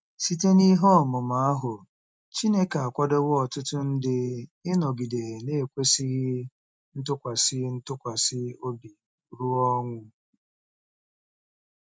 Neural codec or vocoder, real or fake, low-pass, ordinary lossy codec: none; real; none; none